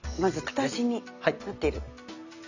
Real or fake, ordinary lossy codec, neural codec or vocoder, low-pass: real; none; none; 7.2 kHz